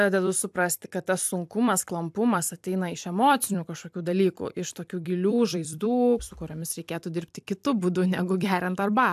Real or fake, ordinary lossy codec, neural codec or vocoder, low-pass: fake; AAC, 96 kbps; vocoder, 44.1 kHz, 128 mel bands every 256 samples, BigVGAN v2; 14.4 kHz